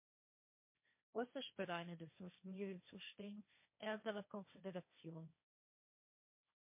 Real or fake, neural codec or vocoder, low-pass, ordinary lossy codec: fake; codec, 16 kHz, 1.1 kbps, Voila-Tokenizer; 3.6 kHz; MP3, 24 kbps